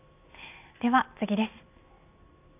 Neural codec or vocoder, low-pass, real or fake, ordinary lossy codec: none; 3.6 kHz; real; none